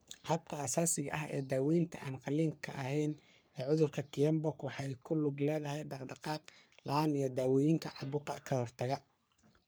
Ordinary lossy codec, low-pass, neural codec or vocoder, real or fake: none; none; codec, 44.1 kHz, 3.4 kbps, Pupu-Codec; fake